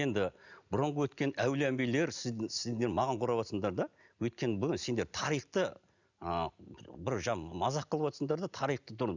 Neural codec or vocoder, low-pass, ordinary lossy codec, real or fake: none; 7.2 kHz; none; real